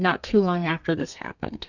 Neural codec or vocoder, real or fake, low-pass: codec, 32 kHz, 1.9 kbps, SNAC; fake; 7.2 kHz